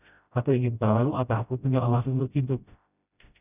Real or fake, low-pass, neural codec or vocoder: fake; 3.6 kHz; codec, 16 kHz, 0.5 kbps, FreqCodec, smaller model